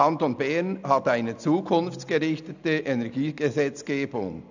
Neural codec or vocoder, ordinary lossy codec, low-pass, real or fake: none; none; 7.2 kHz; real